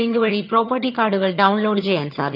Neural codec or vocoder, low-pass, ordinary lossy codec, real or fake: vocoder, 22.05 kHz, 80 mel bands, HiFi-GAN; 5.4 kHz; none; fake